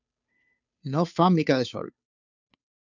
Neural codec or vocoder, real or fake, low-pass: codec, 16 kHz, 2 kbps, FunCodec, trained on Chinese and English, 25 frames a second; fake; 7.2 kHz